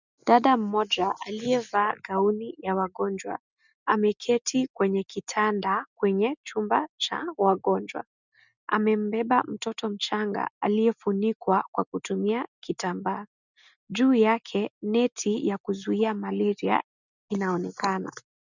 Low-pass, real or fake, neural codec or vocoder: 7.2 kHz; real; none